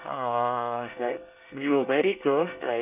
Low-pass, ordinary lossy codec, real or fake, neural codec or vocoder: 3.6 kHz; none; fake; codec, 24 kHz, 1 kbps, SNAC